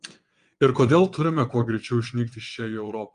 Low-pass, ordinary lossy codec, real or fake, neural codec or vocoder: 9.9 kHz; Opus, 32 kbps; fake; vocoder, 22.05 kHz, 80 mel bands, WaveNeXt